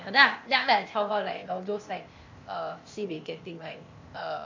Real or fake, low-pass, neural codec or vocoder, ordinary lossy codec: fake; 7.2 kHz; codec, 16 kHz, 0.8 kbps, ZipCodec; MP3, 48 kbps